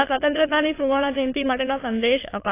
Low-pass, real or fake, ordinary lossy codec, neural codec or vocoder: 3.6 kHz; fake; AAC, 24 kbps; autoencoder, 22.05 kHz, a latent of 192 numbers a frame, VITS, trained on many speakers